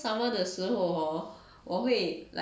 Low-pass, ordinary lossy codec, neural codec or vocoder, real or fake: none; none; none; real